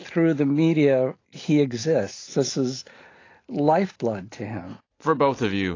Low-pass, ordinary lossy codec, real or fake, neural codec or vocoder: 7.2 kHz; AAC, 32 kbps; real; none